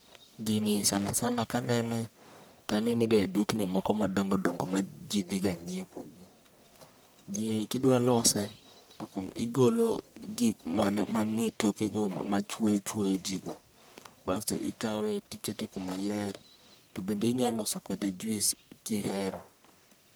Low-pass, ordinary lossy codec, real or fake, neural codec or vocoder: none; none; fake; codec, 44.1 kHz, 1.7 kbps, Pupu-Codec